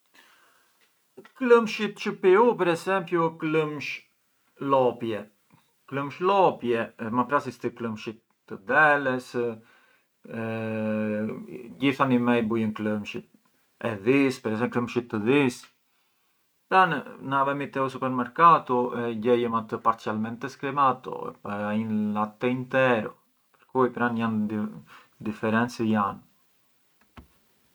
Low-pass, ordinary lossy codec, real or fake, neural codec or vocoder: none; none; real; none